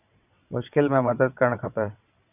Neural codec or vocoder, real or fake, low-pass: vocoder, 22.05 kHz, 80 mel bands, WaveNeXt; fake; 3.6 kHz